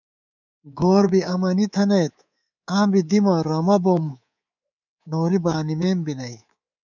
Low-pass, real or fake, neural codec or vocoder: 7.2 kHz; fake; codec, 24 kHz, 3.1 kbps, DualCodec